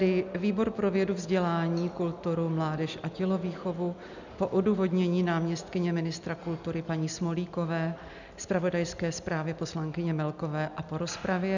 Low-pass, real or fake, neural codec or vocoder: 7.2 kHz; real; none